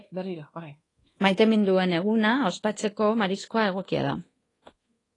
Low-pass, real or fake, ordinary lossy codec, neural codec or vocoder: 10.8 kHz; fake; AAC, 32 kbps; codec, 24 kHz, 1.2 kbps, DualCodec